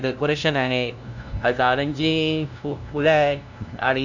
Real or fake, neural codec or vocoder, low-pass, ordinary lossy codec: fake; codec, 16 kHz, 0.5 kbps, FunCodec, trained on LibriTTS, 25 frames a second; 7.2 kHz; none